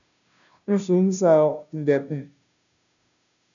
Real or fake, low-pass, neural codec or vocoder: fake; 7.2 kHz; codec, 16 kHz, 0.5 kbps, FunCodec, trained on Chinese and English, 25 frames a second